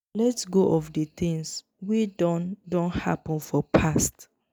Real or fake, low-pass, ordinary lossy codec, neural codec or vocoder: real; none; none; none